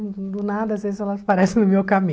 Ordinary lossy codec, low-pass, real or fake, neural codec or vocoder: none; none; real; none